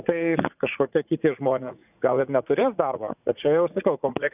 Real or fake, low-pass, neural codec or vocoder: fake; 3.6 kHz; vocoder, 44.1 kHz, 80 mel bands, Vocos